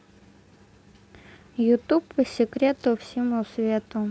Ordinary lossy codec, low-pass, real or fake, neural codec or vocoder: none; none; real; none